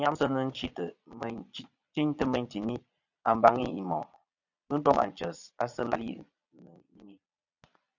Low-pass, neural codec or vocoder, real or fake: 7.2 kHz; vocoder, 22.05 kHz, 80 mel bands, Vocos; fake